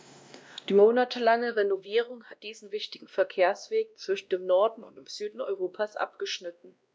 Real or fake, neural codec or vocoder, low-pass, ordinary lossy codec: fake; codec, 16 kHz, 1 kbps, X-Codec, WavLM features, trained on Multilingual LibriSpeech; none; none